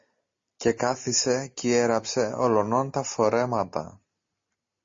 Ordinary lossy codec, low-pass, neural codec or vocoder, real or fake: MP3, 32 kbps; 7.2 kHz; none; real